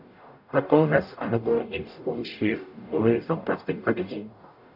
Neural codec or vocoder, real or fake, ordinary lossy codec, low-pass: codec, 44.1 kHz, 0.9 kbps, DAC; fake; none; 5.4 kHz